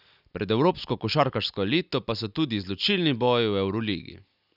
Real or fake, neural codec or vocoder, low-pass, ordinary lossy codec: real; none; 5.4 kHz; none